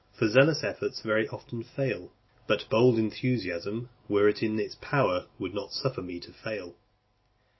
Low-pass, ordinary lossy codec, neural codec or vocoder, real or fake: 7.2 kHz; MP3, 24 kbps; none; real